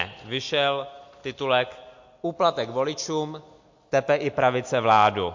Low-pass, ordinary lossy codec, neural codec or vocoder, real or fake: 7.2 kHz; MP3, 48 kbps; none; real